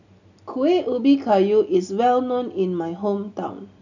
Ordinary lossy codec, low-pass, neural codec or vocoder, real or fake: none; 7.2 kHz; none; real